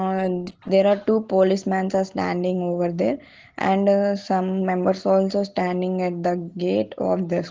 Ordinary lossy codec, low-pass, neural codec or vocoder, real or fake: Opus, 16 kbps; 7.2 kHz; none; real